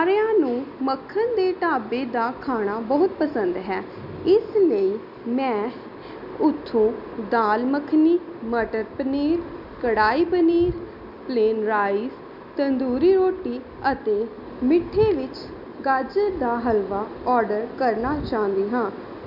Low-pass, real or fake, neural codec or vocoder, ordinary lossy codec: 5.4 kHz; real; none; none